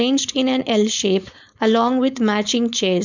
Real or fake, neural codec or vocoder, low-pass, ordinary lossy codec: fake; codec, 16 kHz, 4.8 kbps, FACodec; 7.2 kHz; none